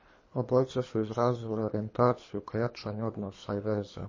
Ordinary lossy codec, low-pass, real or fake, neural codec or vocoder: MP3, 32 kbps; 7.2 kHz; fake; codec, 24 kHz, 3 kbps, HILCodec